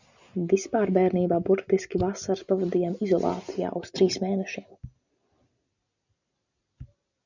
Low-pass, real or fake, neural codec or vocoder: 7.2 kHz; real; none